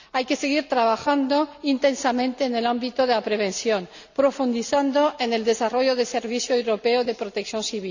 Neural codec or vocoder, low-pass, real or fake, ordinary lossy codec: none; 7.2 kHz; real; none